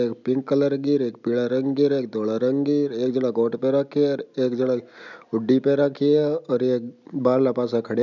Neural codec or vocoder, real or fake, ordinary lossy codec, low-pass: none; real; none; 7.2 kHz